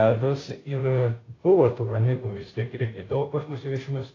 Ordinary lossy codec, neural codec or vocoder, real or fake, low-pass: AAC, 32 kbps; codec, 16 kHz, 0.5 kbps, FunCodec, trained on Chinese and English, 25 frames a second; fake; 7.2 kHz